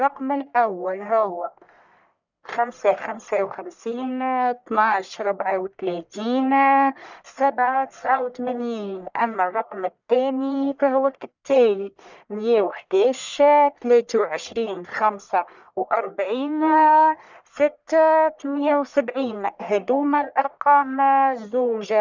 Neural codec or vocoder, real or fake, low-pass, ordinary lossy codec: codec, 44.1 kHz, 1.7 kbps, Pupu-Codec; fake; 7.2 kHz; none